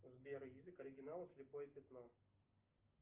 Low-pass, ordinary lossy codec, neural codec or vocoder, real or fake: 3.6 kHz; Opus, 32 kbps; none; real